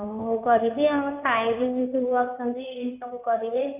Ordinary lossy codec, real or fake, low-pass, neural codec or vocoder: none; fake; 3.6 kHz; codec, 16 kHz in and 24 kHz out, 2.2 kbps, FireRedTTS-2 codec